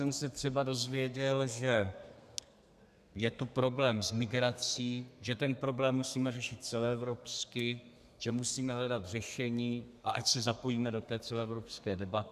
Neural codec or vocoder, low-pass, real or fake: codec, 32 kHz, 1.9 kbps, SNAC; 14.4 kHz; fake